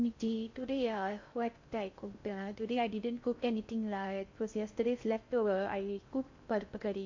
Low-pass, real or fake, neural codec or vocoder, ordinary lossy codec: 7.2 kHz; fake; codec, 16 kHz in and 24 kHz out, 0.6 kbps, FocalCodec, streaming, 4096 codes; MP3, 48 kbps